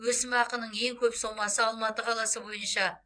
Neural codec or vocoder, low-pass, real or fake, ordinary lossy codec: vocoder, 22.05 kHz, 80 mel bands, WaveNeXt; 9.9 kHz; fake; none